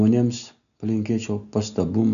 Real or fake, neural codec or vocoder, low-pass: real; none; 7.2 kHz